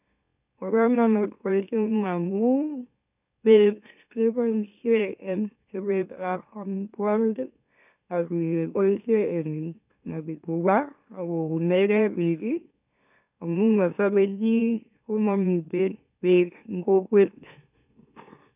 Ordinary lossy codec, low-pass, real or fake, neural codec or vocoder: none; 3.6 kHz; fake; autoencoder, 44.1 kHz, a latent of 192 numbers a frame, MeloTTS